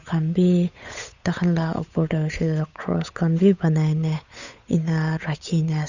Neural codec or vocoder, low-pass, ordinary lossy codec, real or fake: codec, 16 kHz, 8 kbps, FunCodec, trained on Chinese and English, 25 frames a second; 7.2 kHz; none; fake